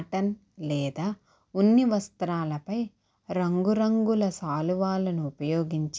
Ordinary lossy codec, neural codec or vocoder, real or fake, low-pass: none; none; real; none